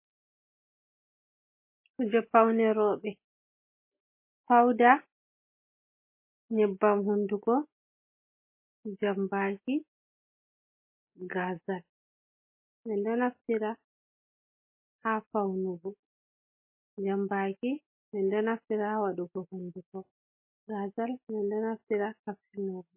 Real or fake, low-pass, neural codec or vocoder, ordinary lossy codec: real; 3.6 kHz; none; MP3, 24 kbps